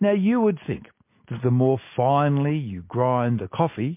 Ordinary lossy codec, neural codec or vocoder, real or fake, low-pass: MP3, 24 kbps; none; real; 3.6 kHz